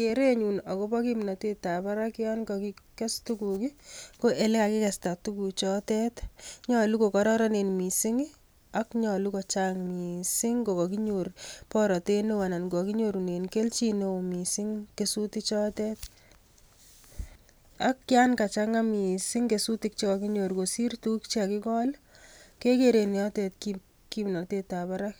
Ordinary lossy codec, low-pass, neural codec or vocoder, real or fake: none; none; none; real